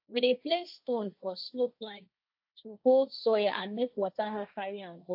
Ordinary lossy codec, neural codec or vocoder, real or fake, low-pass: AAC, 48 kbps; codec, 16 kHz, 1.1 kbps, Voila-Tokenizer; fake; 5.4 kHz